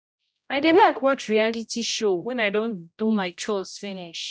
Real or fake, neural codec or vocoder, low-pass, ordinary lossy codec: fake; codec, 16 kHz, 0.5 kbps, X-Codec, HuBERT features, trained on general audio; none; none